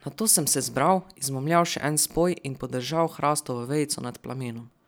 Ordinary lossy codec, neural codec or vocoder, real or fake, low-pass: none; none; real; none